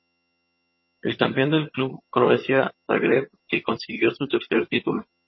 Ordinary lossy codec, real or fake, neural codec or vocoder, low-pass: MP3, 24 kbps; fake; vocoder, 22.05 kHz, 80 mel bands, HiFi-GAN; 7.2 kHz